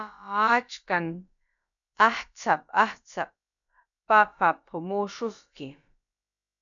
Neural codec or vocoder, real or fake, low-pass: codec, 16 kHz, about 1 kbps, DyCAST, with the encoder's durations; fake; 7.2 kHz